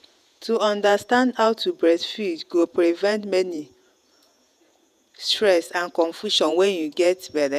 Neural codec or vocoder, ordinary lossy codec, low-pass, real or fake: vocoder, 48 kHz, 128 mel bands, Vocos; none; 14.4 kHz; fake